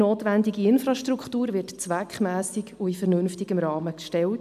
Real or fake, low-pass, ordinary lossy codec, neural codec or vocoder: real; 14.4 kHz; AAC, 96 kbps; none